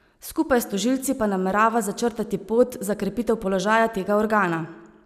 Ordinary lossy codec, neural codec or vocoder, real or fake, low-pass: none; none; real; 14.4 kHz